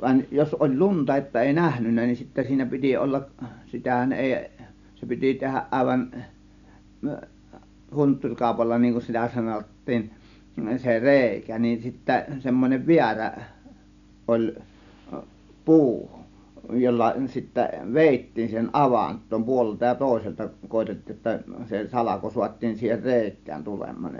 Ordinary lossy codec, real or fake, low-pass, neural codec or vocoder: none; real; 7.2 kHz; none